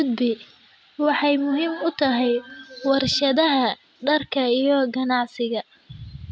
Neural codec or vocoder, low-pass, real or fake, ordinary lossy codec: none; none; real; none